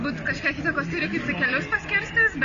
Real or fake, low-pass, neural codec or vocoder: real; 7.2 kHz; none